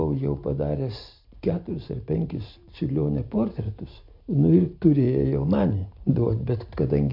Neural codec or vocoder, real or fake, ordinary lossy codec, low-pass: none; real; MP3, 48 kbps; 5.4 kHz